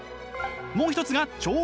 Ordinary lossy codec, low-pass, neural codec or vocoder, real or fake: none; none; none; real